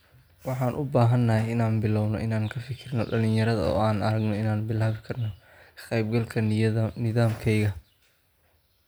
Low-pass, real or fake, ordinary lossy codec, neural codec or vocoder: none; real; none; none